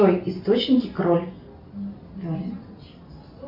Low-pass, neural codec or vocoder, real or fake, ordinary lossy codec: 5.4 kHz; none; real; MP3, 48 kbps